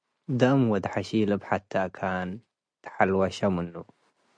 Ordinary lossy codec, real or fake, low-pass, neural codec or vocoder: MP3, 64 kbps; real; 9.9 kHz; none